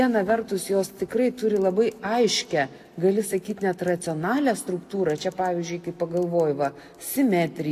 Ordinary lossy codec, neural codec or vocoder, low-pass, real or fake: AAC, 64 kbps; none; 14.4 kHz; real